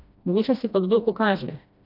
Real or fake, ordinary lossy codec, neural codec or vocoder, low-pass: fake; none; codec, 16 kHz, 1 kbps, FreqCodec, smaller model; 5.4 kHz